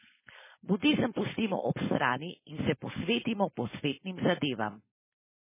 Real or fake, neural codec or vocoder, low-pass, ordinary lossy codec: fake; codec, 16 kHz, 4.8 kbps, FACodec; 3.6 kHz; MP3, 16 kbps